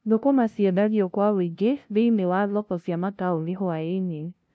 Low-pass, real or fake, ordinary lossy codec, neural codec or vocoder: none; fake; none; codec, 16 kHz, 0.5 kbps, FunCodec, trained on LibriTTS, 25 frames a second